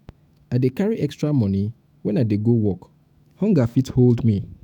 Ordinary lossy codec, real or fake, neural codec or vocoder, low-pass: none; fake; autoencoder, 48 kHz, 128 numbers a frame, DAC-VAE, trained on Japanese speech; 19.8 kHz